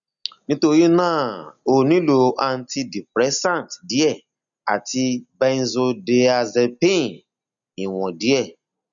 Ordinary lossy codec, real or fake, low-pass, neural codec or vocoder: none; real; 7.2 kHz; none